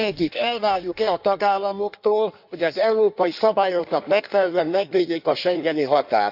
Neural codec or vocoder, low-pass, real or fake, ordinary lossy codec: codec, 16 kHz in and 24 kHz out, 1.1 kbps, FireRedTTS-2 codec; 5.4 kHz; fake; none